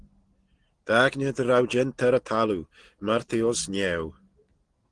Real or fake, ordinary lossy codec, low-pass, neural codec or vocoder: real; Opus, 16 kbps; 9.9 kHz; none